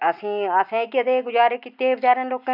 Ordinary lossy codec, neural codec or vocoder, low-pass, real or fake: none; none; 5.4 kHz; real